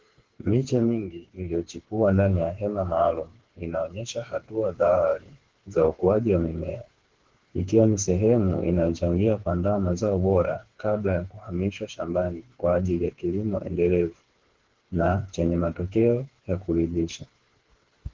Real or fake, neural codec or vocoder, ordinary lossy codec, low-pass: fake; codec, 16 kHz, 4 kbps, FreqCodec, smaller model; Opus, 16 kbps; 7.2 kHz